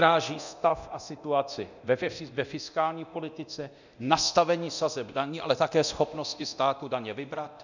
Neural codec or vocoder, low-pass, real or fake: codec, 24 kHz, 0.9 kbps, DualCodec; 7.2 kHz; fake